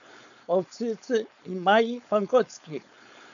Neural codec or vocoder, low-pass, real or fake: codec, 16 kHz, 4.8 kbps, FACodec; 7.2 kHz; fake